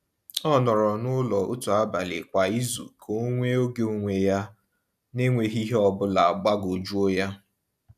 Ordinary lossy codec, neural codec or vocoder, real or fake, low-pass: none; none; real; 14.4 kHz